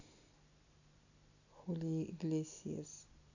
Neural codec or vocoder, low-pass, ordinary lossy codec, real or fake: none; 7.2 kHz; none; real